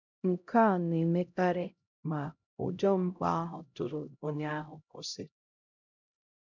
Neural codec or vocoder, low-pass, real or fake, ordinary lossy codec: codec, 16 kHz, 0.5 kbps, X-Codec, HuBERT features, trained on LibriSpeech; 7.2 kHz; fake; none